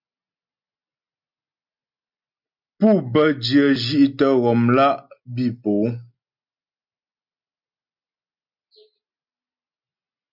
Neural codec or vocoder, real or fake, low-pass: none; real; 5.4 kHz